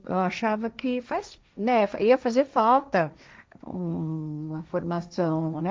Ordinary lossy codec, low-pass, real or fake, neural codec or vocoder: none; none; fake; codec, 16 kHz, 1.1 kbps, Voila-Tokenizer